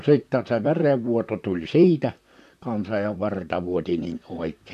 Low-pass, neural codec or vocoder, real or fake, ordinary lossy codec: 14.4 kHz; vocoder, 44.1 kHz, 128 mel bands, Pupu-Vocoder; fake; none